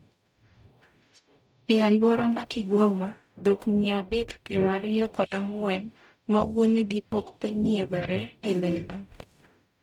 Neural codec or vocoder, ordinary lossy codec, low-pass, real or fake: codec, 44.1 kHz, 0.9 kbps, DAC; none; 19.8 kHz; fake